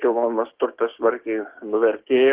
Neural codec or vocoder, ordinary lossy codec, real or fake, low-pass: codec, 16 kHz, 6 kbps, DAC; Opus, 16 kbps; fake; 3.6 kHz